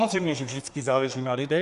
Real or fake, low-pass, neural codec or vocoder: fake; 10.8 kHz; codec, 24 kHz, 1 kbps, SNAC